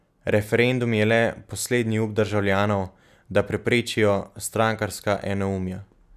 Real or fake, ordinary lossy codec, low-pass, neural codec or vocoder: real; none; 14.4 kHz; none